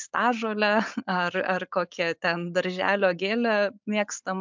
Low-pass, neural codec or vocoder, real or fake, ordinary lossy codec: 7.2 kHz; none; real; MP3, 64 kbps